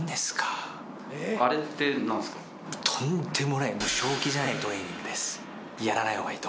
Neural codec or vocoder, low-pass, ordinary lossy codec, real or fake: none; none; none; real